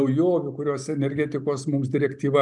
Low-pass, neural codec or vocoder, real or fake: 10.8 kHz; none; real